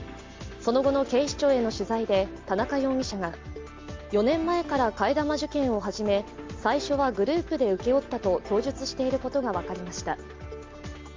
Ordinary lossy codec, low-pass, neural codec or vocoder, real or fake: Opus, 32 kbps; 7.2 kHz; none; real